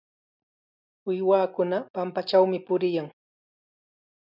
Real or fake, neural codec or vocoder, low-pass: real; none; 5.4 kHz